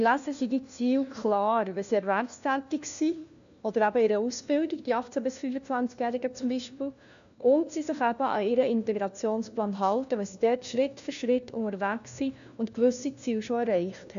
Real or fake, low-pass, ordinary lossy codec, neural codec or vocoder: fake; 7.2 kHz; none; codec, 16 kHz, 1 kbps, FunCodec, trained on LibriTTS, 50 frames a second